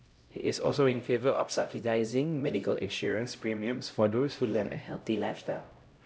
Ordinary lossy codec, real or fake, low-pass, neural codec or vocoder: none; fake; none; codec, 16 kHz, 0.5 kbps, X-Codec, HuBERT features, trained on LibriSpeech